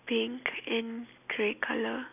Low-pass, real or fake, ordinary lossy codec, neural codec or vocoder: 3.6 kHz; real; none; none